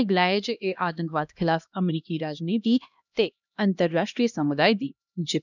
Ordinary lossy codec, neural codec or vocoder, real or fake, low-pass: none; codec, 16 kHz, 1 kbps, X-Codec, HuBERT features, trained on LibriSpeech; fake; 7.2 kHz